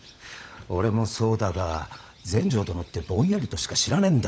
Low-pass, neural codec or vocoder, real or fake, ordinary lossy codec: none; codec, 16 kHz, 16 kbps, FunCodec, trained on LibriTTS, 50 frames a second; fake; none